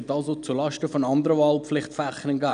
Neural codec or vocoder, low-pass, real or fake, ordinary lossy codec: none; 9.9 kHz; real; none